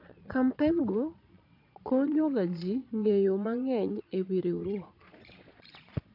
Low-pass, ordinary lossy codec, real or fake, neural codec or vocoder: 5.4 kHz; AAC, 48 kbps; fake; vocoder, 22.05 kHz, 80 mel bands, Vocos